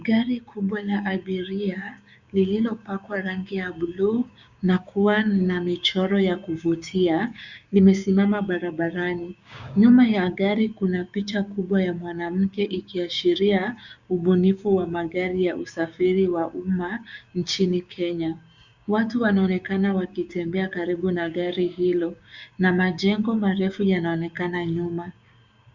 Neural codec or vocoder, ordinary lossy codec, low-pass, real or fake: codec, 16 kHz, 6 kbps, DAC; Opus, 64 kbps; 7.2 kHz; fake